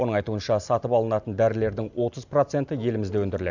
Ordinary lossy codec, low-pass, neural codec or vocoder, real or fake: none; 7.2 kHz; none; real